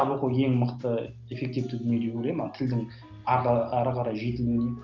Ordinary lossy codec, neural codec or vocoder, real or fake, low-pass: Opus, 32 kbps; none; real; 7.2 kHz